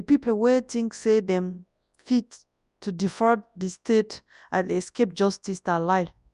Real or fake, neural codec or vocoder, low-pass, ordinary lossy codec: fake; codec, 24 kHz, 0.9 kbps, WavTokenizer, large speech release; 10.8 kHz; none